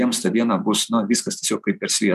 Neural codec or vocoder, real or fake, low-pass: none; real; 14.4 kHz